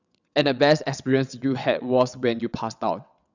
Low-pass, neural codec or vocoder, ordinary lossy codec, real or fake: 7.2 kHz; vocoder, 22.05 kHz, 80 mel bands, WaveNeXt; none; fake